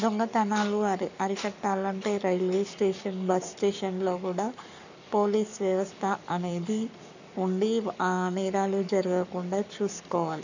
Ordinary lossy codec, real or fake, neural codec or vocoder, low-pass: none; fake; codec, 44.1 kHz, 7.8 kbps, Pupu-Codec; 7.2 kHz